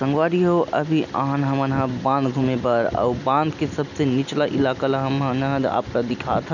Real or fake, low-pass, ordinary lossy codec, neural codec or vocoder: real; 7.2 kHz; none; none